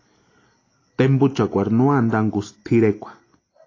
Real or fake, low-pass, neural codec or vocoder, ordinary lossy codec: real; 7.2 kHz; none; AAC, 32 kbps